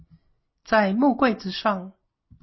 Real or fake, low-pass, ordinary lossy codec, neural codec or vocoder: real; 7.2 kHz; MP3, 24 kbps; none